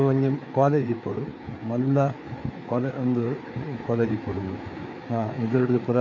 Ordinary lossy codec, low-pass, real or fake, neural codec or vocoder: AAC, 48 kbps; 7.2 kHz; fake; codec, 16 kHz, 4 kbps, FreqCodec, larger model